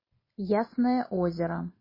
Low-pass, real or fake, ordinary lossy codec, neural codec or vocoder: 5.4 kHz; real; MP3, 24 kbps; none